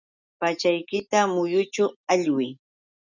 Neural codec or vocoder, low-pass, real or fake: none; 7.2 kHz; real